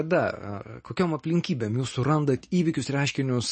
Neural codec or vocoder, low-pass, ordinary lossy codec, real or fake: none; 10.8 kHz; MP3, 32 kbps; real